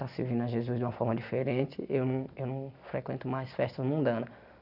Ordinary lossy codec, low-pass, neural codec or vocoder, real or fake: none; 5.4 kHz; none; real